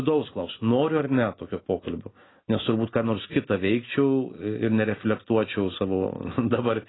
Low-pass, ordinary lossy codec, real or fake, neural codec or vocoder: 7.2 kHz; AAC, 16 kbps; real; none